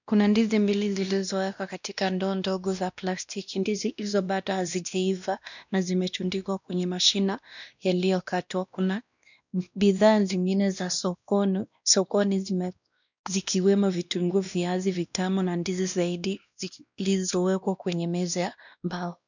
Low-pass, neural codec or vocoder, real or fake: 7.2 kHz; codec, 16 kHz, 1 kbps, X-Codec, WavLM features, trained on Multilingual LibriSpeech; fake